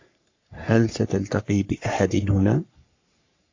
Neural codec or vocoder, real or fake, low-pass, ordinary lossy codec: codec, 44.1 kHz, 3.4 kbps, Pupu-Codec; fake; 7.2 kHz; AAC, 48 kbps